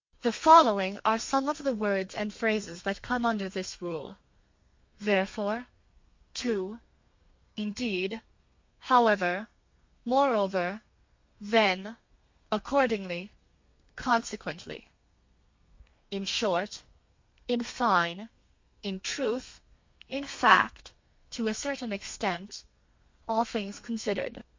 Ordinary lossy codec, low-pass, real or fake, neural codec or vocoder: MP3, 48 kbps; 7.2 kHz; fake; codec, 32 kHz, 1.9 kbps, SNAC